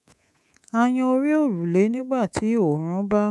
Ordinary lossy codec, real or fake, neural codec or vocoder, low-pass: none; fake; codec, 24 kHz, 3.1 kbps, DualCodec; none